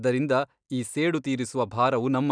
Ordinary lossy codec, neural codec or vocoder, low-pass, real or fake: none; none; 9.9 kHz; real